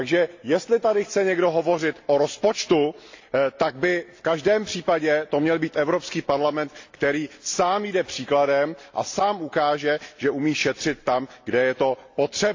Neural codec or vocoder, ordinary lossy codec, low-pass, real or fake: none; AAC, 48 kbps; 7.2 kHz; real